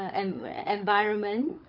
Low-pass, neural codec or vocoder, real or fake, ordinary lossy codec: 5.4 kHz; codec, 16 kHz, 16 kbps, FunCodec, trained on LibriTTS, 50 frames a second; fake; none